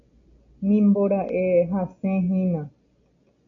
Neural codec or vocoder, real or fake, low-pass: none; real; 7.2 kHz